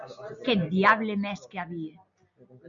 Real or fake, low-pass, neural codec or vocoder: real; 7.2 kHz; none